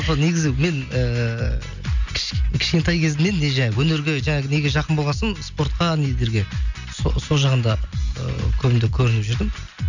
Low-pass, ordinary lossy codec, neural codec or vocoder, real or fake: 7.2 kHz; none; none; real